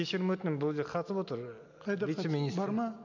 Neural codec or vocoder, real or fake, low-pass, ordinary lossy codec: none; real; 7.2 kHz; none